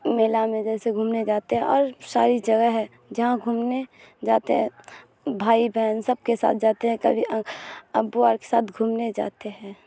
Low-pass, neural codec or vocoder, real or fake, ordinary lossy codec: none; none; real; none